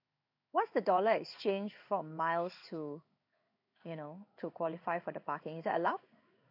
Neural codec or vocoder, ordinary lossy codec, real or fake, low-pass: codec, 16 kHz in and 24 kHz out, 1 kbps, XY-Tokenizer; none; fake; 5.4 kHz